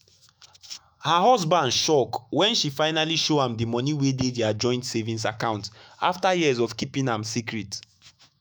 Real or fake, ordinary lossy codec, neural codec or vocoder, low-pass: fake; none; autoencoder, 48 kHz, 128 numbers a frame, DAC-VAE, trained on Japanese speech; none